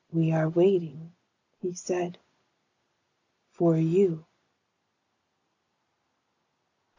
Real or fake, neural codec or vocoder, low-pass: real; none; 7.2 kHz